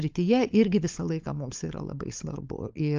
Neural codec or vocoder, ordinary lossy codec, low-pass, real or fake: codec, 16 kHz, 16 kbps, FunCodec, trained on LibriTTS, 50 frames a second; Opus, 24 kbps; 7.2 kHz; fake